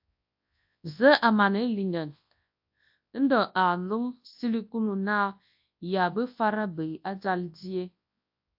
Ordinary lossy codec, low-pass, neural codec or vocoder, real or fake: AAC, 48 kbps; 5.4 kHz; codec, 24 kHz, 0.9 kbps, WavTokenizer, large speech release; fake